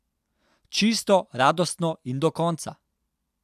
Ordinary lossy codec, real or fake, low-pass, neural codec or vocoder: AAC, 96 kbps; real; 14.4 kHz; none